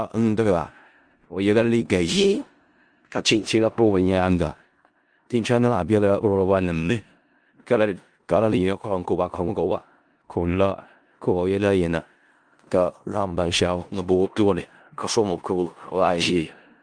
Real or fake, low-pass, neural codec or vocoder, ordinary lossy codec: fake; 9.9 kHz; codec, 16 kHz in and 24 kHz out, 0.4 kbps, LongCat-Audio-Codec, four codebook decoder; Opus, 32 kbps